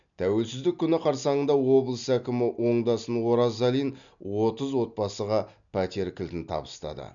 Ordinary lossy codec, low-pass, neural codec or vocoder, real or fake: none; 7.2 kHz; none; real